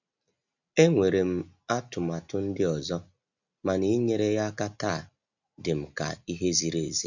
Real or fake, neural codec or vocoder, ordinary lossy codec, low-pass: real; none; none; 7.2 kHz